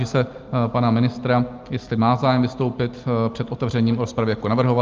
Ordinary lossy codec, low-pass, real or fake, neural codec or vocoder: Opus, 24 kbps; 7.2 kHz; real; none